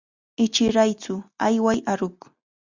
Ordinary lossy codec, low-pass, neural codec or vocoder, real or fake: Opus, 64 kbps; 7.2 kHz; none; real